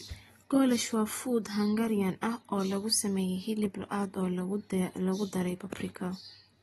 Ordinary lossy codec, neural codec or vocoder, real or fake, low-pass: AAC, 32 kbps; none; real; 19.8 kHz